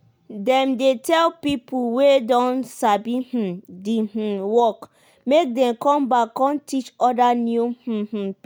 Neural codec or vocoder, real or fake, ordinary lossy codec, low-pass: none; real; none; none